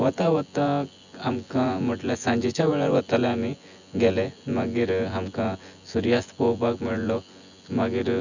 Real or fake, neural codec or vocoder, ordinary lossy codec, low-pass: fake; vocoder, 24 kHz, 100 mel bands, Vocos; none; 7.2 kHz